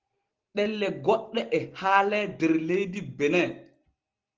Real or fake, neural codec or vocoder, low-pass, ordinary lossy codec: real; none; 7.2 kHz; Opus, 16 kbps